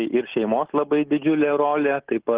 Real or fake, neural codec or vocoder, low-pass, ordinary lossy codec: real; none; 3.6 kHz; Opus, 16 kbps